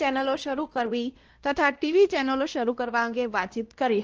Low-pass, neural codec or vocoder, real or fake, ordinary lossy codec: 7.2 kHz; codec, 16 kHz, 1 kbps, X-Codec, WavLM features, trained on Multilingual LibriSpeech; fake; Opus, 16 kbps